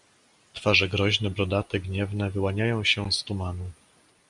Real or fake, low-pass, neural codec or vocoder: real; 10.8 kHz; none